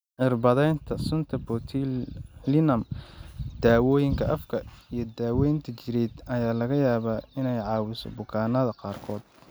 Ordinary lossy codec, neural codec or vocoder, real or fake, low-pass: none; none; real; none